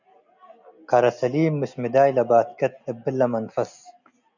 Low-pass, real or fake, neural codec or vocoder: 7.2 kHz; real; none